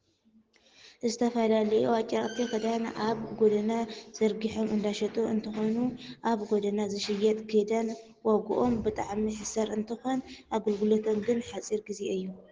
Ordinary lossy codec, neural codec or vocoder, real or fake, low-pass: Opus, 16 kbps; none; real; 7.2 kHz